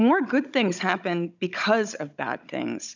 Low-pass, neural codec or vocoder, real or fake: 7.2 kHz; codec, 16 kHz, 16 kbps, FunCodec, trained on Chinese and English, 50 frames a second; fake